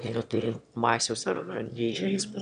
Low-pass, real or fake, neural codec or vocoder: 9.9 kHz; fake; autoencoder, 22.05 kHz, a latent of 192 numbers a frame, VITS, trained on one speaker